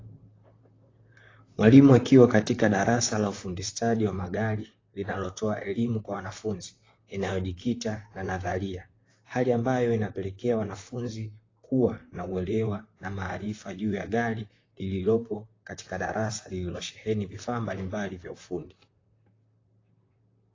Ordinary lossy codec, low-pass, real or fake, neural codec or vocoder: AAC, 32 kbps; 7.2 kHz; fake; vocoder, 44.1 kHz, 128 mel bands, Pupu-Vocoder